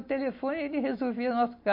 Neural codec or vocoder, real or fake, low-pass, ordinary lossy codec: none; real; 5.4 kHz; MP3, 32 kbps